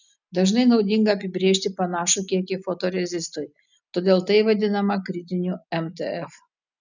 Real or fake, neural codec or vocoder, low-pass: real; none; 7.2 kHz